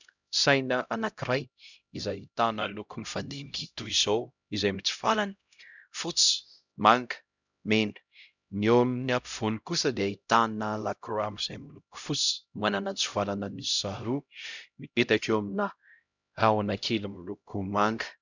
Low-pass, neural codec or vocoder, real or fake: 7.2 kHz; codec, 16 kHz, 0.5 kbps, X-Codec, HuBERT features, trained on LibriSpeech; fake